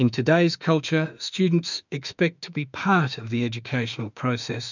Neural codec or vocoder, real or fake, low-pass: autoencoder, 48 kHz, 32 numbers a frame, DAC-VAE, trained on Japanese speech; fake; 7.2 kHz